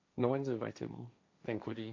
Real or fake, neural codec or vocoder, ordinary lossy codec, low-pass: fake; codec, 16 kHz, 1.1 kbps, Voila-Tokenizer; AAC, 48 kbps; 7.2 kHz